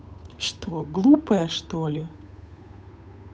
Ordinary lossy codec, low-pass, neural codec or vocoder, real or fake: none; none; codec, 16 kHz, 8 kbps, FunCodec, trained on Chinese and English, 25 frames a second; fake